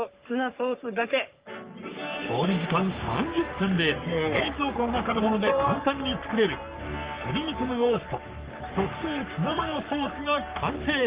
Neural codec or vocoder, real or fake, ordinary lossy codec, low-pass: codec, 44.1 kHz, 3.4 kbps, Pupu-Codec; fake; Opus, 32 kbps; 3.6 kHz